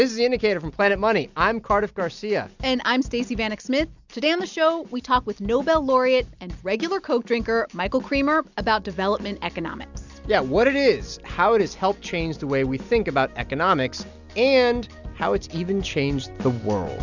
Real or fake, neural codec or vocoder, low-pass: real; none; 7.2 kHz